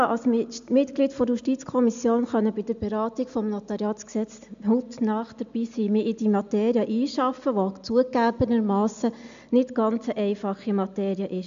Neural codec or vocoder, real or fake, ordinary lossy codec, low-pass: none; real; MP3, 64 kbps; 7.2 kHz